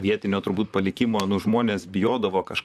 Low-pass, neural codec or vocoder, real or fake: 14.4 kHz; vocoder, 44.1 kHz, 128 mel bands, Pupu-Vocoder; fake